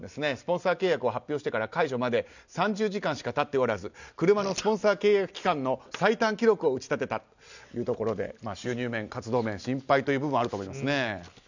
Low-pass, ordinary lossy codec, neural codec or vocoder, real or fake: 7.2 kHz; none; none; real